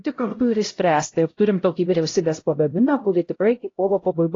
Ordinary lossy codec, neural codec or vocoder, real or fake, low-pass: AAC, 32 kbps; codec, 16 kHz, 0.5 kbps, X-Codec, HuBERT features, trained on LibriSpeech; fake; 7.2 kHz